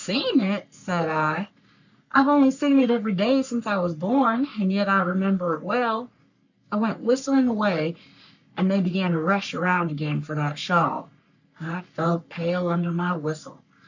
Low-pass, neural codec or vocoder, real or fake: 7.2 kHz; codec, 44.1 kHz, 3.4 kbps, Pupu-Codec; fake